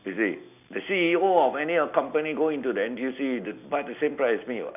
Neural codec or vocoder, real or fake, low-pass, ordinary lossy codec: none; real; 3.6 kHz; none